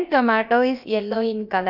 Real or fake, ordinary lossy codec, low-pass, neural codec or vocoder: fake; none; 5.4 kHz; codec, 16 kHz, about 1 kbps, DyCAST, with the encoder's durations